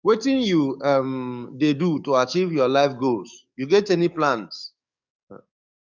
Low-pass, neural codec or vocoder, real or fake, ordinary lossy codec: 7.2 kHz; codec, 44.1 kHz, 7.8 kbps, DAC; fake; none